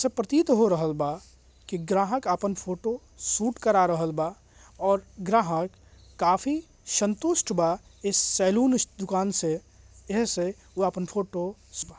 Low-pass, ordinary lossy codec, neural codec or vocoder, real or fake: none; none; none; real